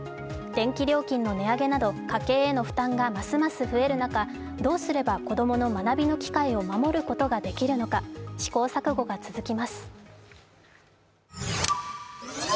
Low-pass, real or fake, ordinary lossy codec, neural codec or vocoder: none; real; none; none